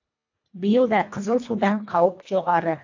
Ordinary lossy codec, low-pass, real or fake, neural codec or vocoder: AAC, 48 kbps; 7.2 kHz; fake; codec, 24 kHz, 1.5 kbps, HILCodec